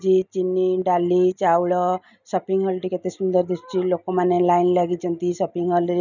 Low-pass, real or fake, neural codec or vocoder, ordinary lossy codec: 7.2 kHz; real; none; none